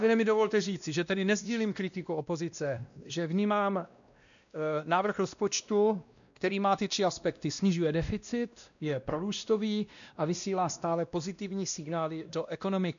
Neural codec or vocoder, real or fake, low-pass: codec, 16 kHz, 1 kbps, X-Codec, WavLM features, trained on Multilingual LibriSpeech; fake; 7.2 kHz